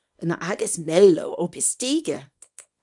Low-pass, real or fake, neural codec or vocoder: 10.8 kHz; fake; codec, 24 kHz, 0.9 kbps, WavTokenizer, small release